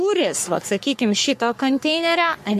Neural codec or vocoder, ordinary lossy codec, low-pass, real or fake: codec, 44.1 kHz, 3.4 kbps, Pupu-Codec; MP3, 64 kbps; 14.4 kHz; fake